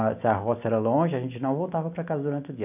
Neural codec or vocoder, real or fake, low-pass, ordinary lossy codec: none; real; 3.6 kHz; none